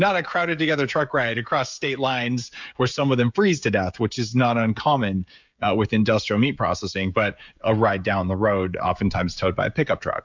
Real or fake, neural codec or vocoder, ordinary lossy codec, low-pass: fake; codec, 16 kHz, 16 kbps, FreqCodec, smaller model; MP3, 64 kbps; 7.2 kHz